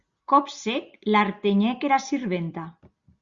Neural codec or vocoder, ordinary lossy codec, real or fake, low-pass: none; Opus, 64 kbps; real; 7.2 kHz